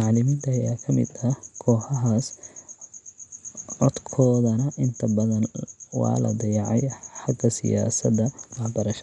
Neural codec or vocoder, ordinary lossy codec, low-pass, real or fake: none; none; 10.8 kHz; real